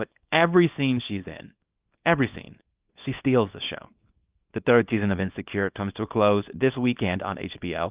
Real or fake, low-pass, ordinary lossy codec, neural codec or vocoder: fake; 3.6 kHz; Opus, 32 kbps; codec, 24 kHz, 0.9 kbps, WavTokenizer, small release